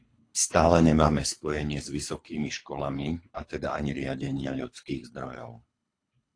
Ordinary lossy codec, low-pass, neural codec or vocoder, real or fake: AAC, 48 kbps; 9.9 kHz; codec, 24 kHz, 3 kbps, HILCodec; fake